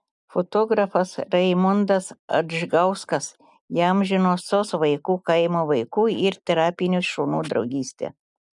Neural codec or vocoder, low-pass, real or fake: none; 10.8 kHz; real